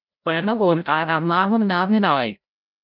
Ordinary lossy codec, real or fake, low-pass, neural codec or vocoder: none; fake; 5.4 kHz; codec, 16 kHz, 0.5 kbps, FreqCodec, larger model